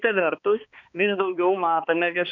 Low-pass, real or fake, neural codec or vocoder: 7.2 kHz; fake; codec, 16 kHz, 2 kbps, X-Codec, HuBERT features, trained on balanced general audio